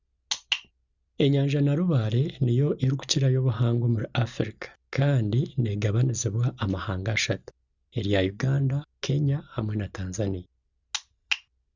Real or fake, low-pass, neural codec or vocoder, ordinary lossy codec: real; 7.2 kHz; none; Opus, 64 kbps